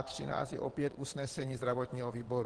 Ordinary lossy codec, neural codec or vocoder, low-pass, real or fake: Opus, 16 kbps; vocoder, 22.05 kHz, 80 mel bands, WaveNeXt; 9.9 kHz; fake